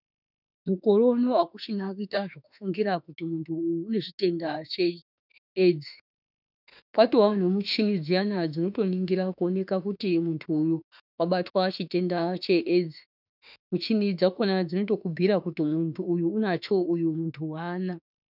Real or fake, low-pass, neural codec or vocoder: fake; 5.4 kHz; autoencoder, 48 kHz, 32 numbers a frame, DAC-VAE, trained on Japanese speech